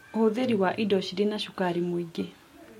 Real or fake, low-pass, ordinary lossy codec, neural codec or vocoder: real; 19.8 kHz; MP3, 64 kbps; none